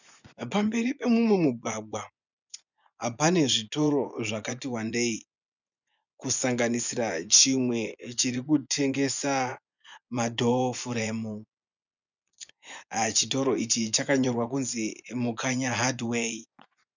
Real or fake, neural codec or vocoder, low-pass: fake; vocoder, 24 kHz, 100 mel bands, Vocos; 7.2 kHz